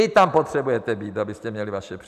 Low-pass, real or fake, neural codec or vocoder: 14.4 kHz; fake; vocoder, 44.1 kHz, 128 mel bands every 512 samples, BigVGAN v2